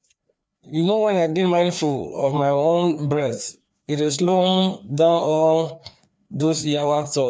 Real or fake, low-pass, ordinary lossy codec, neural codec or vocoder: fake; none; none; codec, 16 kHz, 2 kbps, FreqCodec, larger model